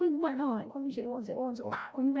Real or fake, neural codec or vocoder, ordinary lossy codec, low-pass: fake; codec, 16 kHz, 0.5 kbps, FreqCodec, larger model; none; none